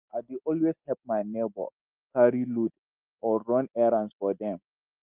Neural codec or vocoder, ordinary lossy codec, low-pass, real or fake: none; Opus, 24 kbps; 3.6 kHz; real